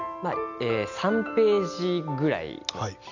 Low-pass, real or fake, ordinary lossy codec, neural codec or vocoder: 7.2 kHz; real; none; none